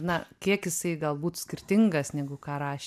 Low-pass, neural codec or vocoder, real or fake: 14.4 kHz; none; real